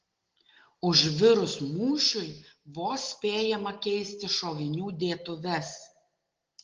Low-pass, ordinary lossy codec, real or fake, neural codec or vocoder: 7.2 kHz; Opus, 16 kbps; real; none